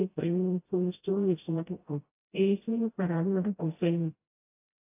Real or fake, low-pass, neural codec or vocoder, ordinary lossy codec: fake; 3.6 kHz; codec, 16 kHz, 0.5 kbps, FreqCodec, smaller model; AAC, 24 kbps